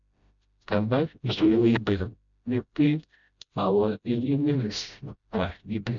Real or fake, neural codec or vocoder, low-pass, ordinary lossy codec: fake; codec, 16 kHz, 0.5 kbps, FreqCodec, smaller model; 7.2 kHz; none